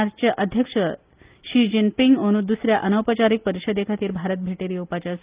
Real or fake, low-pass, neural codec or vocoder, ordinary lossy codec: real; 3.6 kHz; none; Opus, 32 kbps